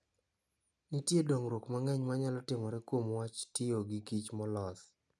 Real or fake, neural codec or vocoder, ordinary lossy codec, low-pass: real; none; none; none